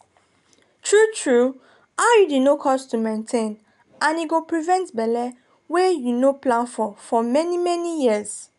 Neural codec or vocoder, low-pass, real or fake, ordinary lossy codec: none; 10.8 kHz; real; none